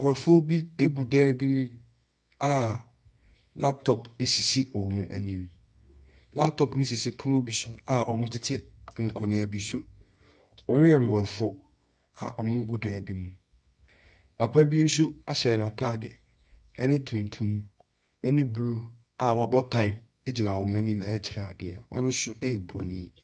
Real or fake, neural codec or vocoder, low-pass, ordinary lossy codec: fake; codec, 24 kHz, 0.9 kbps, WavTokenizer, medium music audio release; 10.8 kHz; MP3, 64 kbps